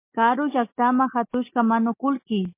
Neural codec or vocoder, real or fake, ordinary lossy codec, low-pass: none; real; MP3, 24 kbps; 3.6 kHz